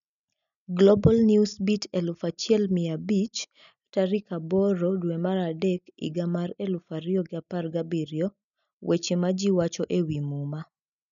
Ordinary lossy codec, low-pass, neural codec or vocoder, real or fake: none; 7.2 kHz; none; real